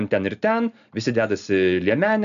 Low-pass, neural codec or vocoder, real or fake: 7.2 kHz; none; real